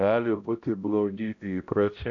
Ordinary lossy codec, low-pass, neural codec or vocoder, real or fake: AAC, 64 kbps; 7.2 kHz; codec, 16 kHz, 0.5 kbps, X-Codec, HuBERT features, trained on balanced general audio; fake